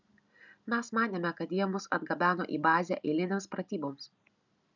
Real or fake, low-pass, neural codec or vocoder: real; 7.2 kHz; none